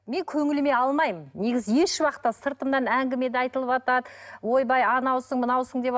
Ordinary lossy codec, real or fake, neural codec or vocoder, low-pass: none; real; none; none